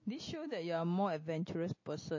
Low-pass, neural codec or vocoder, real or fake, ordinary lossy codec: 7.2 kHz; none; real; MP3, 32 kbps